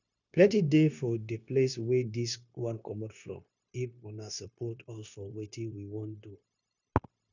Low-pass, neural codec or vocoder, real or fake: 7.2 kHz; codec, 16 kHz, 0.9 kbps, LongCat-Audio-Codec; fake